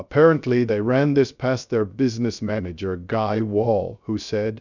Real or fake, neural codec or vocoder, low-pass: fake; codec, 16 kHz, 0.3 kbps, FocalCodec; 7.2 kHz